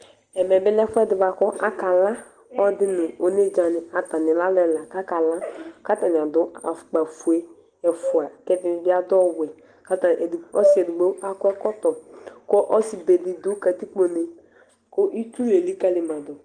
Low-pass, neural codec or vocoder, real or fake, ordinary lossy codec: 9.9 kHz; none; real; Opus, 24 kbps